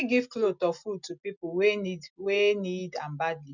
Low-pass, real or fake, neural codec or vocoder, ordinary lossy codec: 7.2 kHz; real; none; none